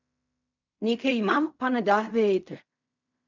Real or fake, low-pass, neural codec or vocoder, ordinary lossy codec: fake; 7.2 kHz; codec, 16 kHz in and 24 kHz out, 0.4 kbps, LongCat-Audio-Codec, fine tuned four codebook decoder; none